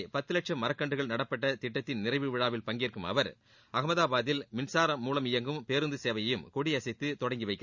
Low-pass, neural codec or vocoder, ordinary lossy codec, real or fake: 7.2 kHz; none; none; real